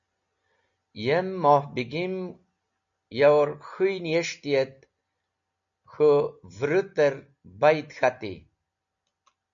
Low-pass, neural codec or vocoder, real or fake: 7.2 kHz; none; real